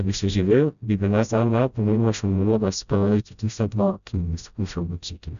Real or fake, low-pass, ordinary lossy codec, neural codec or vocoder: fake; 7.2 kHz; none; codec, 16 kHz, 0.5 kbps, FreqCodec, smaller model